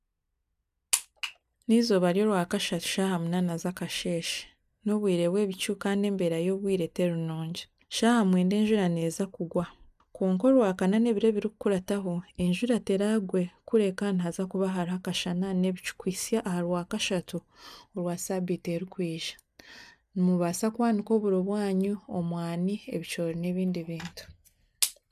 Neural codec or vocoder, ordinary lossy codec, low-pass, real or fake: none; none; 14.4 kHz; real